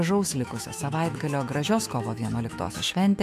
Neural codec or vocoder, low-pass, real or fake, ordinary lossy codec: autoencoder, 48 kHz, 128 numbers a frame, DAC-VAE, trained on Japanese speech; 14.4 kHz; fake; MP3, 96 kbps